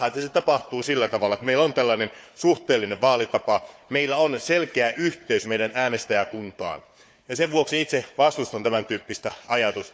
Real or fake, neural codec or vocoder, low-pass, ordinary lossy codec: fake; codec, 16 kHz, 4 kbps, FunCodec, trained on Chinese and English, 50 frames a second; none; none